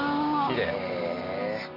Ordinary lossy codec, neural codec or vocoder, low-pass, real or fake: none; none; 5.4 kHz; real